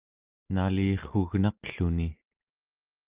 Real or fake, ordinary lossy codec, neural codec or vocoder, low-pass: real; Opus, 32 kbps; none; 3.6 kHz